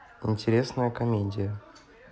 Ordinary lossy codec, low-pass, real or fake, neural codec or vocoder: none; none; real; none